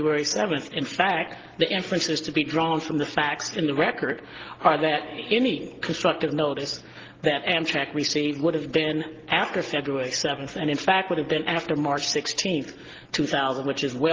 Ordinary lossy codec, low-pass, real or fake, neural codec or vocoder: Opus, 16 kbps; 7.2 kHz; real; none